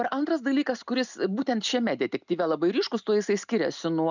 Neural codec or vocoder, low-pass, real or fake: none; 7.2 kHz; real